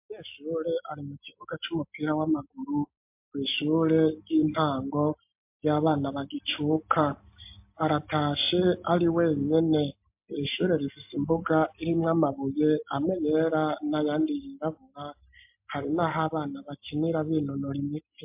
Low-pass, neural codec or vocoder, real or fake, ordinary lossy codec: 3.6 kHz; none; real; MP3, 32 kbps